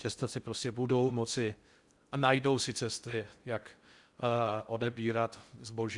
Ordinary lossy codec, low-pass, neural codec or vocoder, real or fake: Opus, 64 kbps; 10.8 kHz; codec, 16 kHz in and 24 kHz out, 0.6 kbps, FocalCodec, streaming, 4096 codes; fake